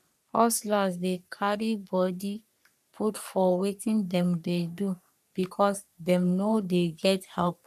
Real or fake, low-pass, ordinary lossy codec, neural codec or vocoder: fake; 14.4 kHz; none; codec, 44.1 kHz, 3.4 kbps, Pupu-Codec